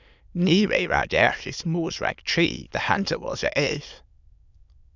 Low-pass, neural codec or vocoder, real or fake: 7.2 kHz; autoencoder, 22.05 kHz, a latent of 192 numbers a frame, VITS, trained on many speakers; fake